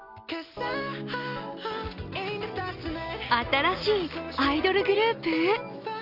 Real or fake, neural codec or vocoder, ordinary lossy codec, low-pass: real; none; none; 5.4 kHz